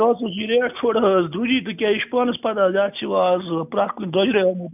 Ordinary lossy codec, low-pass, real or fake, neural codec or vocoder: none; 3.6 kHz; real; none